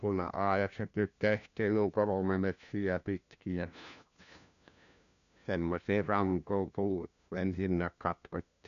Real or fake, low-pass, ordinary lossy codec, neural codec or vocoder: fake; 7.2 kHz; none; codec, 16 kHz, 1 kbps, FunCodec, trained on LibriTTS, 50 frames a second